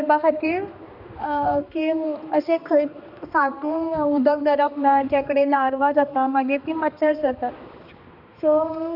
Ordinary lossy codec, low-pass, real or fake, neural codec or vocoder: Opus, 64 kbps; 5.4 kHz; fake; codec, 16 kHz, 2 kbps, X-Codec, HuBERT features, trained on balanced general audio